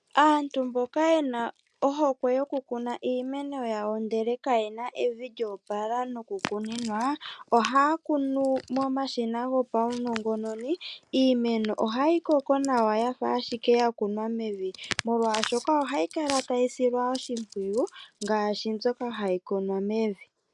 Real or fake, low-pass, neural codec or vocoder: real; 10.8 kHz; none